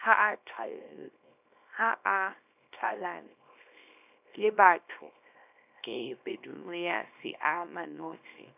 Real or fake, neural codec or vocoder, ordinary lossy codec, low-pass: fake; codec, 24 kHz, 0.9 kbps, WavTokenizer, small release; none; 3.6 kHz